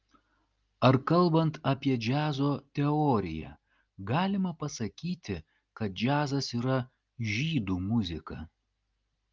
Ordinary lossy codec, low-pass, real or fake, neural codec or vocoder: Opus, 32 kbps; 7.2 kHz; real; none